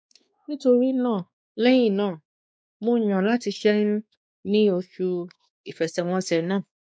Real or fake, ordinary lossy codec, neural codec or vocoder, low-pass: fake; none; codec, 16 kHz, 2 kbps, X-Codec, WavLM features, trained on Multilingual LibriSpeech; none